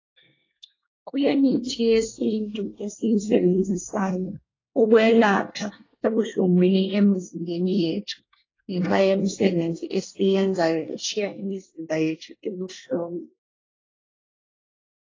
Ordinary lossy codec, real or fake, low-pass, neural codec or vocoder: AAC, 32 kbps; fake; 7.2 kHz; codec, 24 kHz, 1 kbps, SNAC